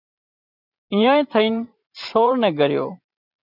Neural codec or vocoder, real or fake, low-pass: vocoder, 44.1 kHz, 128 mel bands every 512 samples, BigVGAN v2; fake; 5.4 kHz